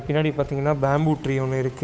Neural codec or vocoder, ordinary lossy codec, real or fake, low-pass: codec, 16 kHz, 8 kbps, FunCodec, trained on Chinese and English, 25 frames a second; none; fake; none